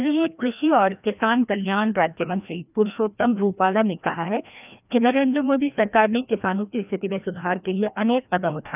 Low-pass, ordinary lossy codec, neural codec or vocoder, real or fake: 3.6 kHz; none; codec, 16 kHz, 1 kbps, FreqCodec, larger model; fake